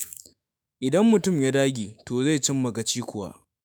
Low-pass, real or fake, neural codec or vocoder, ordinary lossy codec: none; fake; autoencoder, 48 kHz, 128 numbers a frame, DAC-VAE, trained on Japanese speech; none